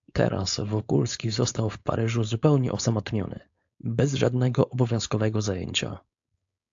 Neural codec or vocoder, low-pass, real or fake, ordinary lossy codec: codec, 16 kHz, 4.8 kbps, FACodec; 7.2 kHz; fake; AAC, 64 kbps